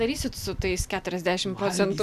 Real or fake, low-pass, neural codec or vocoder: real; 14.4 kHz; none